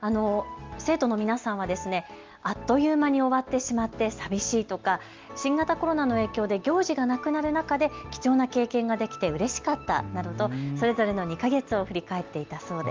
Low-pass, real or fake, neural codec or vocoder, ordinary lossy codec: 7.2 kHz; real; none; Opus, 32 kbps